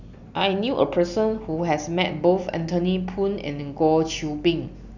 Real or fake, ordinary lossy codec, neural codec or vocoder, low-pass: real; none; none; 7.2 kHz